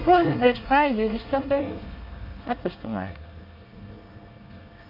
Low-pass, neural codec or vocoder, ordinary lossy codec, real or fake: 5.4 kHz; codec, 24 kHz, 1 kbps, SNAC; none; fake